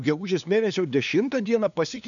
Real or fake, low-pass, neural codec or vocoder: fake; 7.2 kHz; codec, 16 kHz, 2 kbps, FunCodec, trained on LibriTTS, 25 frames a second